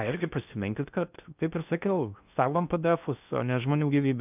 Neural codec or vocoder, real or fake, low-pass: codec, 16 kHz in and 24 kHz out, 0.6 kbps, FocalCodec, streaming, 4096 codes; fake; 3.6 kHz